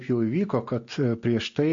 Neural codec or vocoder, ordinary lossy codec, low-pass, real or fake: none; MP3, 48 kbps; 7.2 kHz; real